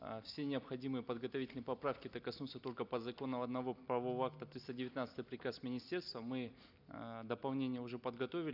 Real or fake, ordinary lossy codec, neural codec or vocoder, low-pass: real; none; none; 5.4 kHz